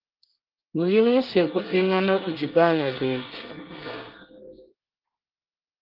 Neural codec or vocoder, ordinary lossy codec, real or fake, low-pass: codec, 24 kHz, 1 kbps, SNAC; Opus, 32 kbps; fake; 5.4 kHz